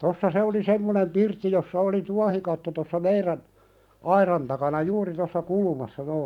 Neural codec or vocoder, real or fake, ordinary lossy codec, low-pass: vocoder, 44.1 kHz, 128 mel bands every 512 samples, BigVGAN v2; fake; none; 19.8 kHz